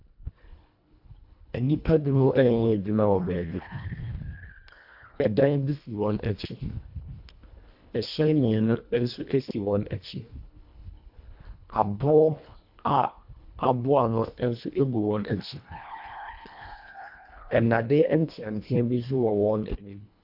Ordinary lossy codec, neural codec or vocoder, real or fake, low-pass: AAC, 48 kbps; codec, 24 kHz, 1.5 kbps, HILCodec; fake; 5.4 kHz